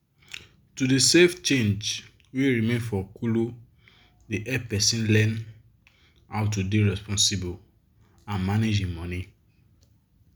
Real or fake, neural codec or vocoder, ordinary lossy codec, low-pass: real; none; none; none